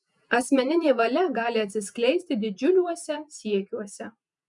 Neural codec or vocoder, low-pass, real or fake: vocoder, 48 kHz, 128 mel bands, Vocos; 10.8 kHz; fake